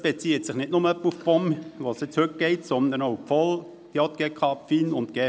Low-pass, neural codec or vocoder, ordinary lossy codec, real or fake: none; none; none; real